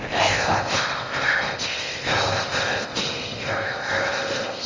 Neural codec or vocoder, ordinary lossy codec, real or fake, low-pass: codec, 16 kHz in and 24 kHz out, 0.6 kbps, FocalCodec, streaming, 4096 codes; Opus, 32 kbps; fake; 7.2 kHz